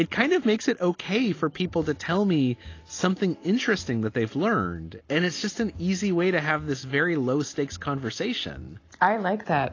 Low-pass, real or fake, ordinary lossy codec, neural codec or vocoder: 7.2 kHz; real; AAC, 32 kbps; none